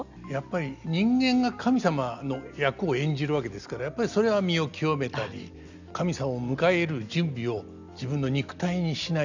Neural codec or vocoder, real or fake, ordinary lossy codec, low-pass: none; real; none; 7.2 kHz